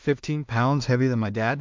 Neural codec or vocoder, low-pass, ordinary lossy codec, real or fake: codec, 16 kHz in and 24 kHz out, 0.4 kbps, LongCat-Audio-Codec, two codebook decoder; 7.2 kHz; MP3, 64 kbps; fake